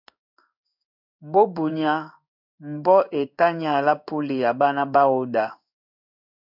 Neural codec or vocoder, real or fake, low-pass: codec, 16 kHz in and 24 kHz out, 1 kbps, XY-Tokenizer; fake; 5.4 kHz